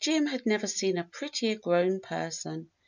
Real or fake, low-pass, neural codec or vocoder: real; 7.2 kHz; none